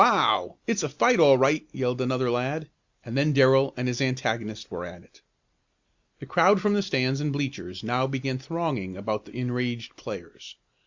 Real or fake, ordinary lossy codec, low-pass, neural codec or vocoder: real; Opus, 64 kbps; 7.2 kHz; none